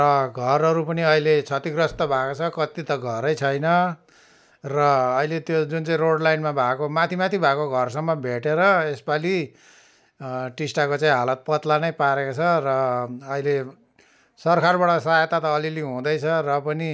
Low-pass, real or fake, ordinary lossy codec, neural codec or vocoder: none; real; none; none